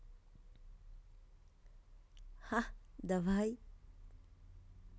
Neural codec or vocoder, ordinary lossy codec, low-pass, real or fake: none; none; none; real